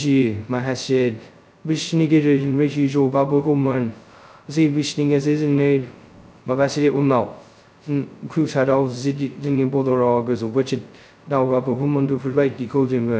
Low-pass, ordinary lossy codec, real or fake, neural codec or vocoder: none; none; fake; codec, 16 kHz, 0.2 kbps, FocalCodec